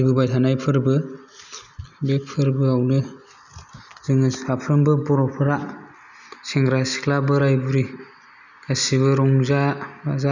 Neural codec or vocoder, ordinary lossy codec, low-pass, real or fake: none; none; 7.2 kHz; real